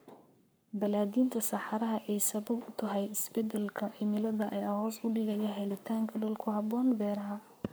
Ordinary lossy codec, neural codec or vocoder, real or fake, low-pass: none; codec, 44.1 kHz, 7.8 kbps, Pupu-Codec; fake; none